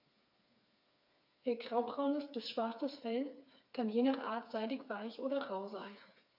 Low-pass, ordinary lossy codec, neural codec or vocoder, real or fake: 5.4 kHz; AAC, 48 kbps; codec, 16 kHz, 4 kbps, FreqCodec, smaller model; fake